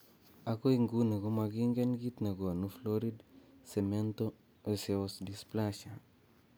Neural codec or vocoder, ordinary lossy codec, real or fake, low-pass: none; none; real; none